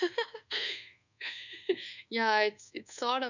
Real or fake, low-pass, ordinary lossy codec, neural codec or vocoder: fake; 7.2 kHz; none; codec, 24 kHz, 1.2 kbps, DualCodec